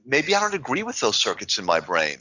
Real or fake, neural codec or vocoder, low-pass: real; none; 7.2 kHz